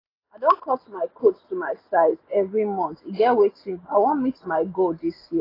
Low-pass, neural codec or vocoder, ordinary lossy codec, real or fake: 5.4 kHz; none; AAC, 24 kbps; real